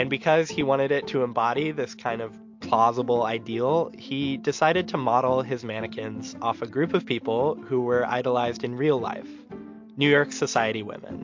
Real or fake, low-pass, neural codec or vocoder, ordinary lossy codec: real; 7.2 kHz; none; MP3, 48 kbps